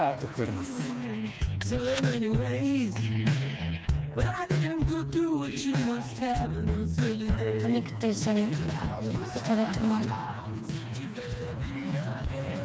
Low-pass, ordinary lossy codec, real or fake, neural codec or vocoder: none; none; fake; codec, 16 kHz, 2 kbps, FreqCodec, smaller model